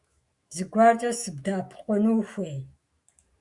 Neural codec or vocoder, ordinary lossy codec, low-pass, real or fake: autoencoder, 48 kHz, 128 numbers a frame, DAC-VAE, trained on Japanese speech; Opus, 64 kbps; 10.8 kHz; fake